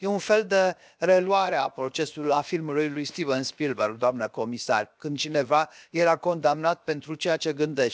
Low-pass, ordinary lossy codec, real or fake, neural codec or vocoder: none; none; fake; codec, 16 kHz, 0.7 kbps, FocalCodec